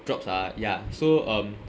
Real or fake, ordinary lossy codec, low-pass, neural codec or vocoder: real; none; none; none